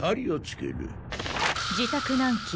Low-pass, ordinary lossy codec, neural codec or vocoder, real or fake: none; none; none; real